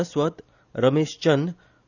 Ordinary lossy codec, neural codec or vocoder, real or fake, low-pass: none; none; real; 7.2 kHz